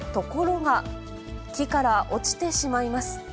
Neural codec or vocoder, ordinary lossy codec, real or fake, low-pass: none; none; real; none